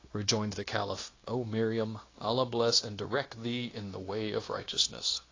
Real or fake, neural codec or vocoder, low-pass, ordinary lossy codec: fake; codec, 16 kHz, 0.9 kbps, LongCat-Audio-Codec; 7.2 kHz; AAC, 32 kbps